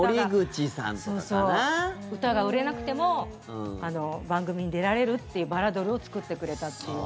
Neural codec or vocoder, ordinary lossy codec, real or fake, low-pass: none; none; real; none